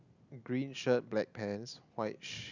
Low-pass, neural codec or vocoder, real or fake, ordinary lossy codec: 7.2 kHz; none; real; none